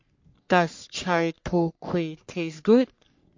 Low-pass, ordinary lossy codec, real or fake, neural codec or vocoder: 7.2 kHz; MP3, 48 kbps; fake; codec, 44.1 kHz, 3.4 kbps, Pupu-Codec